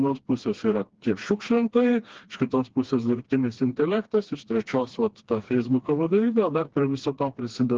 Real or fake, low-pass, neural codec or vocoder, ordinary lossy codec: fake; 7.2 kHz; codec, 16 kHz, 2 kbps, FreqCodec, smaller model; Opus, 16 kbps